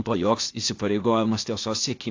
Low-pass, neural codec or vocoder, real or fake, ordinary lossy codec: 7.2 kHz; codec, 16 kHz, 0.8 kbps, ZipCodec; fake; AAC, 48 kbps